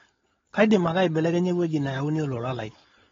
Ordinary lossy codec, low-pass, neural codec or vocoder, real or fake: AAC, 24 kbps; 7.2 kHz; codec, 16 kHz, 4.8 kbps, FACodec; fake